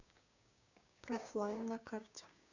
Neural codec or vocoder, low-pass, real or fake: codec, 16 kHz, 6 kbps, DAC; 7.2 kHz; fake